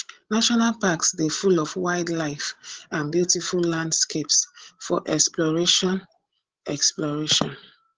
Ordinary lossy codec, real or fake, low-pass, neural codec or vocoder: Opus, 16 kbps; fake; 7.2 kHz; codec, 16 kHz, 16 kbps, FreqCodec, larger model